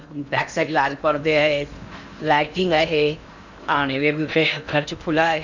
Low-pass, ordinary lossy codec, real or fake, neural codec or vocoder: 7.2 kHz; none; fake; codec, 16 kHz in and 24 kHz out, 0.8 kbps, FocalCodec, streaming, 65536 codes